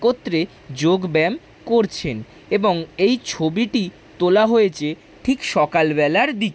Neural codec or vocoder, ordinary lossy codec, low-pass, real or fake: none; none; none; real